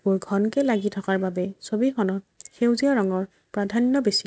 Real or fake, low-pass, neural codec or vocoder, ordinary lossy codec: real; none; none; none